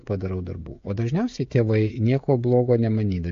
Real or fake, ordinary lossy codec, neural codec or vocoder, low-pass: fake; AAC, 48 kbps; codec, 16 kHz, 16 kbps, FreqCodec, smaller model; 7.2 kHz